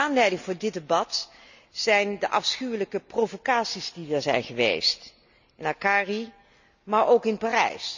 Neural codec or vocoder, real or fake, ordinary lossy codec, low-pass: none; real; none; 7.2 kHz